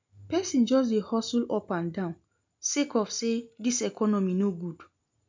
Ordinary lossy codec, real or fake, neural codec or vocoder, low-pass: MP3, 64 kbps; real; none; 7.2 kHz